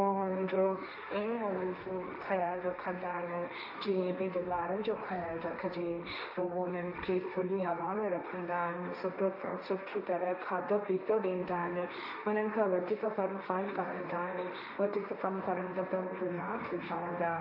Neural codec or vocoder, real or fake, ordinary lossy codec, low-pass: codec, 16 kHz, 1.1 kbps, Voila-Tokenizer; fake; none; 5.4 kHz